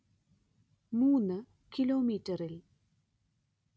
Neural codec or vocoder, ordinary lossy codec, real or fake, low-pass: none; none; real; none